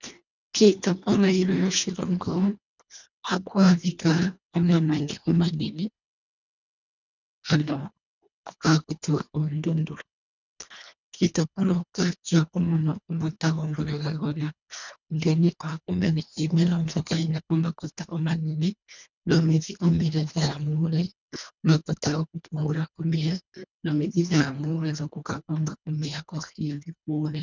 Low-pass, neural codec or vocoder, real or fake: 7.2 kHz; codec, 24 kHz, 1.5 kbps, HILCodec; fake